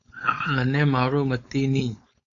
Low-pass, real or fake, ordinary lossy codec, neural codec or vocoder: 7.2 kHz; fake; AAC, 64 kbps; codec, 16 kHz, 4.8 kbps, FACodec